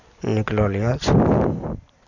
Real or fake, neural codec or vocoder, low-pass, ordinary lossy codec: real; none; 7.2 kHz; none